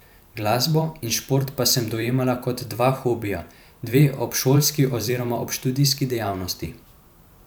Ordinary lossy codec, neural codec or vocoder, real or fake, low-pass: none; vocoder, 44.1 kHz, 128 mel bands every 512 samples, BigVGAN v2; fake; none